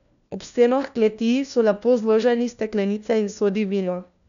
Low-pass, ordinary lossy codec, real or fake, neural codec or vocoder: 7.2 kHz; none; fake; codec, 16 kHz, 1 kbps, FunCodec, trained on LibriTTS, 50 frames a second